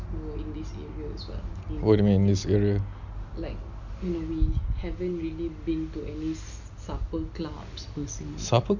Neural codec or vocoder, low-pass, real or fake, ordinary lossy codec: none; 7.2 kHz; real; none